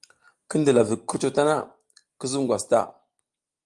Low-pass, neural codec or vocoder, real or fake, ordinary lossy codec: 10.8 kHz; none; real; Opus, 32 kbps